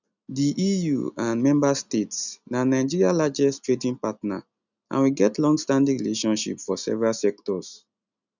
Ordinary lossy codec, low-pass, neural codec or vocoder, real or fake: none; 7.2 kHz; none; real